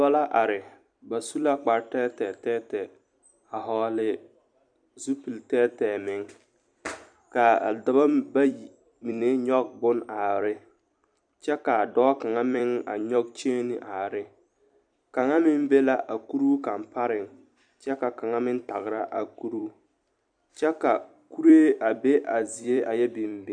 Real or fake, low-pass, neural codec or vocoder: fake; 9.9 kHz; vocoder, 44.1 kHz, 128 mel bands every 256 samples, BigVGAN v2